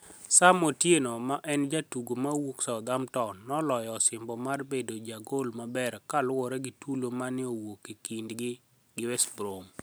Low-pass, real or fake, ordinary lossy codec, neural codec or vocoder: none; real; none; none